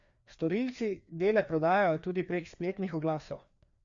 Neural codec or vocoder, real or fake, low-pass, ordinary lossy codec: codec, 16 kHz, 2 kbps, FreqCodec, larger model; fake; 7.2 kHz; Opus, 64 kbps